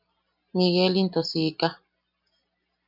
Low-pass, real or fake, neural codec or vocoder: 5.4 kHz; real; none